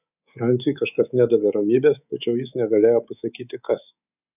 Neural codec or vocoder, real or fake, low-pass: codec, 24 kHz, 3.1 kbps, DualCodec; fake; 3.6 kHz